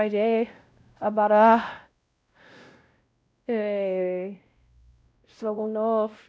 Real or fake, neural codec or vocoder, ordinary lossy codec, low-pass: fake; codec, 16 kHz, 0.5 kbps, X-Codec, WavLM features, trained on Multilingual LibriSpeech; none; none